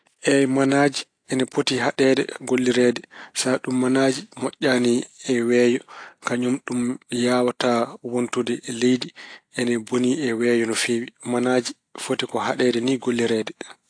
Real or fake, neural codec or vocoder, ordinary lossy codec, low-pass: real; none; AAC, 48 kbps; 9.9 kHz